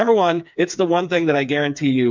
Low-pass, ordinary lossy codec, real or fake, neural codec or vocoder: 7.2 kHz; MP3, 64 kbps; fake; codec, 16 kHz, 4 kbps, FreqCodec, smaller model